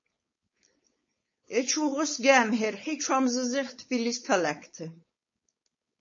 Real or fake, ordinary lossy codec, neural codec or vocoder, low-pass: fake; MP3, 32 kbps; codec, 16 kHz, 4.8 kbps, FACodec; 7.2 kHz